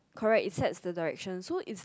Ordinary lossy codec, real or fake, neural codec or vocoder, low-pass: none; real; none; none